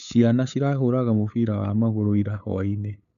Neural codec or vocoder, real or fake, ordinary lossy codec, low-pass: codec, 16 kHz, 8 kbps, FunCodec, trained on LibriTTS, 25 frames a second; fake; none; 7.2 kHz